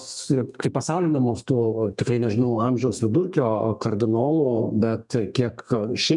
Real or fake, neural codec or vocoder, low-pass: fake; codec, 32 kHz, 1.9 kbps, SNAC; 10.8 kHz